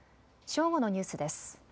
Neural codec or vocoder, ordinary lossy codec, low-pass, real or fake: none; none; none; real